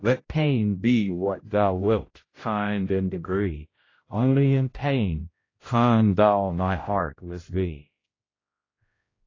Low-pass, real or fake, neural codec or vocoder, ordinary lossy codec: 7.2 kHz; fake; codec, 16 kHz, 0.5 kbps, X-Codec, HuBERT features, trained on general audio; AAC, 32 kbps